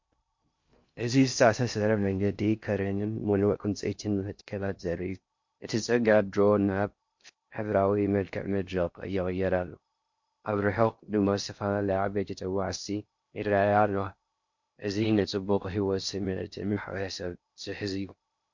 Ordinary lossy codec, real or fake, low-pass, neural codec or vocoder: MP3, 48 kbps; fake; 7.2 kHz; codec, 16 kHz in and 24 kHz out, 0.6 kbps, FocalCodec, streaming, 2048 codes